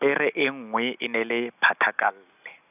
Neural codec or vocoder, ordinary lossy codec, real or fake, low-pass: none; none; real; 3.6 kHz